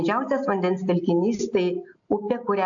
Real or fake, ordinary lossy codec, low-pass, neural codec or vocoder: real; AAC, 64 kbps; 7.2 kHz; none